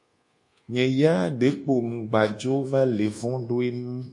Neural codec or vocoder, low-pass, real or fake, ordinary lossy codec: codec, 24 kHz, 1.2 kbps, DualCodec; 10.8 kHz; fake; MP3, 48 kbps